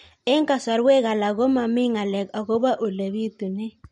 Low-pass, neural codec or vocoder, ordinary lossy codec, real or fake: 19.8 kHz; none; MP3, 48 kbps; real